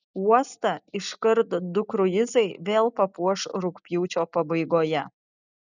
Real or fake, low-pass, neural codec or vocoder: real; 7.2 kHz; none